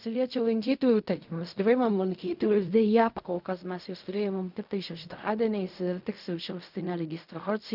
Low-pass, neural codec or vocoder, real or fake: 5.4 kHz; codec, 16 kHz in and 24 kHz out, 0.4 kbps, LongCat-Audio-Codec, fine tuned four codebook decoder; fake